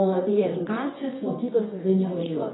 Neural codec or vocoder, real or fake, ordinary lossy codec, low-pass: codec, 24 kHz, 0.9 kbps, WavTokenizer, medium music audio release; fake; AAC, 16 kbps; 7.2 kHz